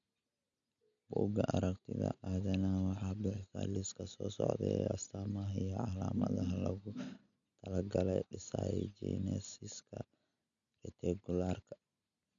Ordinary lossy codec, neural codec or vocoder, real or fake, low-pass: none; none; real; 7.2 kHz